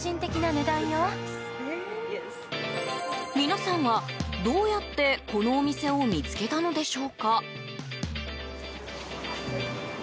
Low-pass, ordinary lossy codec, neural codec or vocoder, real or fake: none; none; none; real